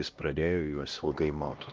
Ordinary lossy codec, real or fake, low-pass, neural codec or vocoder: Opus, 24 kbps; fake; 7.2 kHz; codec, 16 kHz, 1 kbps, X-Codec, HuBERT features, trained on LibriSpeech